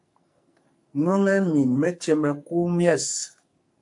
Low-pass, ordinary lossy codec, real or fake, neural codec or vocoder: 10.8 kHz; AAC, 64 kbps; fake; codec, 44.1 kHz, 2.6 kbps, SNAC